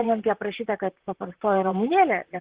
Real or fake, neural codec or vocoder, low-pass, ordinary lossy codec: fake; vocoder, 44.1 kHz, 128 mel bands, Pupu-Vocoder; 3.6 kHz; Opus, 16 kbps